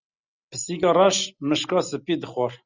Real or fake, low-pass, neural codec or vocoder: real; 7.2 kHz; none